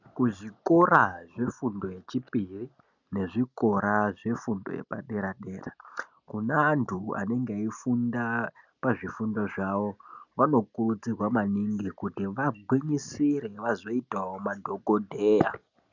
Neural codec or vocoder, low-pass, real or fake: none; 7.2 kHz; real